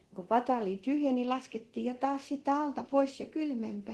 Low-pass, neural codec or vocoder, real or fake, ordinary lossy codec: 10.8 kHz; codec, 24 kHz, 0.9 kbps, DualCodec; fake; Opus, 16 kbps